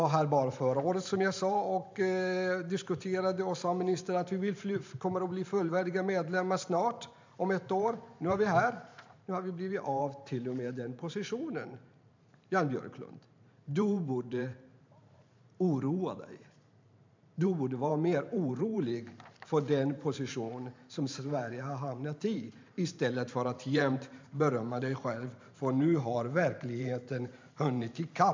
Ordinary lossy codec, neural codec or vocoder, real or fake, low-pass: MP3, 64 kbps; vocoder, 44.1 kHz, 128 mel bands every 512 samples, BigVGAN v2; fake; 7.2 kHz